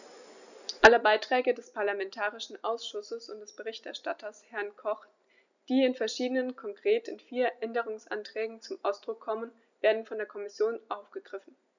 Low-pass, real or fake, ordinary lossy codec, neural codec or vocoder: 7.2 kHz; real; none; none